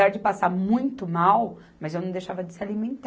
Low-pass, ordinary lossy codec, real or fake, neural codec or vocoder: none; none; real; none